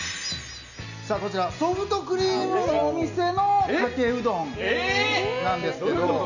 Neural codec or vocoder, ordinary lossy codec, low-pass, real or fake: none; none; 7.2 kHz; real